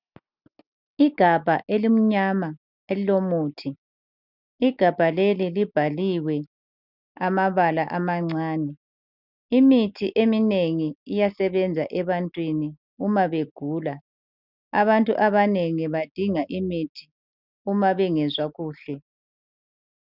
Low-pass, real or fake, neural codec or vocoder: 5.4 kHz; real; none